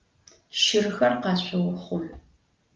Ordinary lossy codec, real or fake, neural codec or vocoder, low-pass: Opus, 32 kbps; real; none; 7.2 kHz